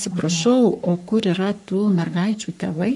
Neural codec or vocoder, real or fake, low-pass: codec, 44.1 kHz, 3.4 kbps, Pupu-Codec; fake; 10.8 kHz